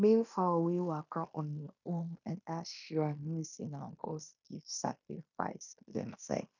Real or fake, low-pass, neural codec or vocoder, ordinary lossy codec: fake; 7.2 kHz; codec, 16 kHz in and 24 kHz out, 0.9 kbps, LongCat-Audio-Codec, fine tuned four codebook decoder; none